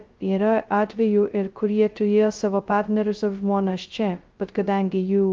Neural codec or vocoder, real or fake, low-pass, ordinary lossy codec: codec, 16 kHz, 0.2 kbps, FocalCodec; fake; 7.2 kHz; Opus, 32 kbps